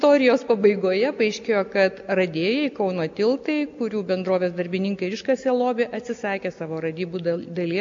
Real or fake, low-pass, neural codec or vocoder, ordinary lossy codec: real; 7.2 kHz; none; MP3, 48 kbps